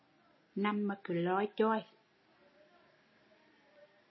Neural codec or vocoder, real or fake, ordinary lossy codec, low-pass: none; real; MP3, 24 kbps; 7.2 kHz